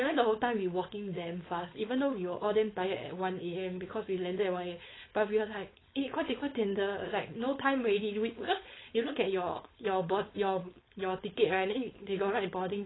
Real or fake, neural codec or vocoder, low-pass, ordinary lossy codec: fake; codec, 16 kHz, 4.8 kbps, FACodec; 7.2 kHz; AAC, 16 kbps